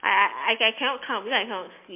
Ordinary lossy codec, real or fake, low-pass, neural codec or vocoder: MP3, 24 kbps; real; 3.6 kHz; none